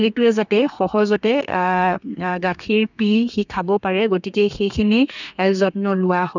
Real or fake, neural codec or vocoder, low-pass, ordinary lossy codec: fake; codec, 32 kHz, 1.9 kbps, SNAC; 7.2 kHz; none